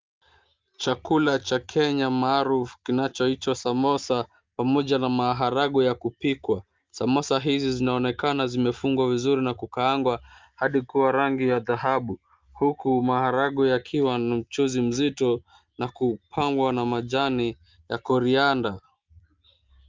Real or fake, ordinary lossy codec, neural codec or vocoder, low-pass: real; Opus, 32 kbps; none; 7.2 kHz